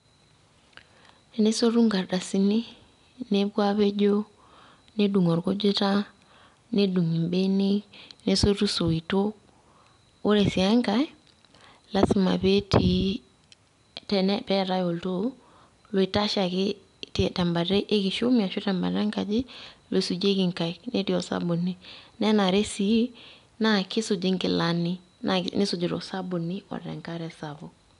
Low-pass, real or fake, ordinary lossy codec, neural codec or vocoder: 10.8 kHz; real; none; none